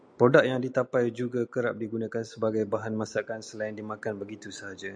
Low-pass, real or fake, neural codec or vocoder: 9.9 kHz; real; none